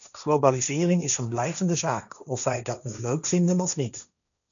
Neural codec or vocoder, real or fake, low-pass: codec, 16 kHz, 1.1 kbps, Voila-Tokenizer; fake; 7.2 kHz